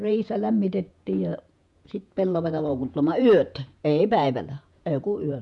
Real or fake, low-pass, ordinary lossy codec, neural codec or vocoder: fake; 10.8 kHz; none; vocoder, 48 kHz, 128 mel bands, Vocos